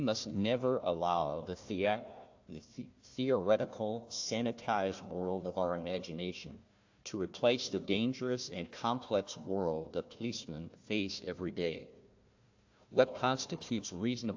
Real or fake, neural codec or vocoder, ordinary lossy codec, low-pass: fake; codec, 16 kHz, 1 kbps, FunCodec, trained on Chinese and English, 50 frames a second; AAC, 48 kbps; 7.2 kHz